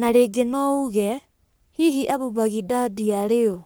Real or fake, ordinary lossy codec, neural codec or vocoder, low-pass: fake; none; codec, 44.1 kHz, 3.4 kbps, Pupu-Codec; none